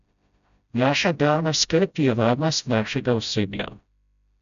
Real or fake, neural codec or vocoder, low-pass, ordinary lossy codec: fake; codec, 16 kHz, 0.5 kbps, FreqCodec, smaller model; 7.2 kHz; none